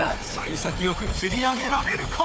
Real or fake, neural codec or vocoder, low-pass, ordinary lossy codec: fake; codec, 16 kHz, 4 kbps, FunCodec, trained on Chinese and English, 50 frames a second; none; none